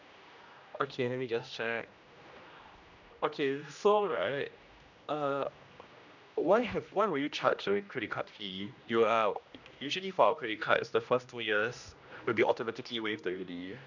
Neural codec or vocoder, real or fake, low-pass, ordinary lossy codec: codec, 16 kHz, 1 kbps, X-Codec, HuBERT features, trained on general audio; fake; 7.2 kHz; none